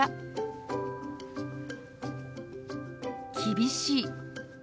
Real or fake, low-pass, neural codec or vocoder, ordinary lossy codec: real; none; none; none